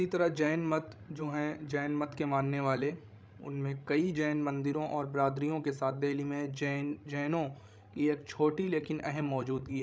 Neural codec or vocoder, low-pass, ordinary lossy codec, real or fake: codec, 16 kHz, 16 kbps, FreqCodec, larger model; none; none; fake